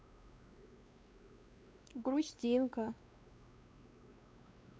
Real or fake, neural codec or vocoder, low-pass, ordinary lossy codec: fake; codec, 16 kHz, 2 kbps, X-Codec, WavLM features, trained on Multilingual LibriSpeech; none; none